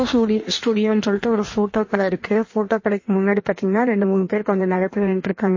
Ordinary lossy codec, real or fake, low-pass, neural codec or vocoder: MP3, 32 kbps; fake; 7.2 kHz; codec, 16 kHz in and 24 kHz out, 1.1 kbps, FireRedTTS-2 codec